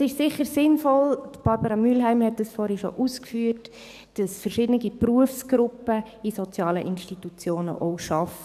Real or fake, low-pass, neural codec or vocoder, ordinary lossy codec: fake; 14.4 kHz; codec, 44.1 kHz, 7.8 kbps, DAC; none